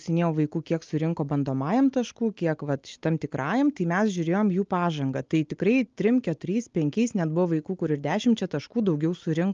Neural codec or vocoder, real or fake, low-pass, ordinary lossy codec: none; real; 7.2 kHz; Opus, 24 kbps